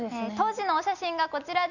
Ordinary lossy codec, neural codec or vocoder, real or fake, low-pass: none; none; real; 7.2 kHz